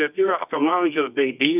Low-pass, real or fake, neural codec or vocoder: 3.6 kHz; fake; codec, 24 kHz, 0.9 kbps, WavTokenizer, medium music audio release